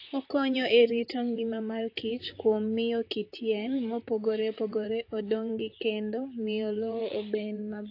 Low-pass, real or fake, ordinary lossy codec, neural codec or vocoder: 5.4 kHz; fake; AAC, 32 kbps; vocoder, 44.1 kHz, 128 mel bands, Pupu-Vocoder